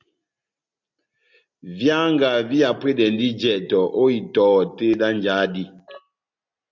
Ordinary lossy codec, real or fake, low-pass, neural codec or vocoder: MP3, 48 kbps; real; 7.2 kHz; none